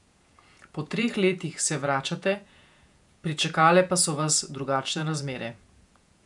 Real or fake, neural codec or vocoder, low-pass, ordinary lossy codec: fake; vocoder, 48 kHz, 128 mel bands, Vocos; 10.8 kHz; none